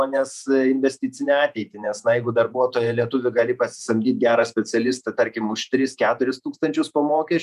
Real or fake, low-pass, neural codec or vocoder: fake; 14.4 kHz; vocoder, 48 kHz, 128 mel bands, Vocos